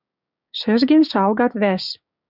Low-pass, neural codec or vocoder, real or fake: 5.4 kHz; codec, 16 kHz, 6 kbps, DAC; fake